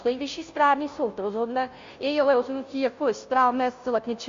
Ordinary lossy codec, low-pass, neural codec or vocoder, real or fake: MP3, 48 kbps; 7.2 kHz; codec, 16 kHz, 0.5 kbps, FunCodec, trained on Chinese and English, 25 frames a second; fake